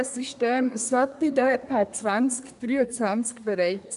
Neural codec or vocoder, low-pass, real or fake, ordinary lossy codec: codec, 24 kHz, 1 kbps, SNAC; 10.8 kHz; fake; none